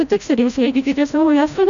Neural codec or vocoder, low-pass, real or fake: codec, 16 kHz, 0.5 kbps, FreqCodec, larger model; 7.2 kHz; fake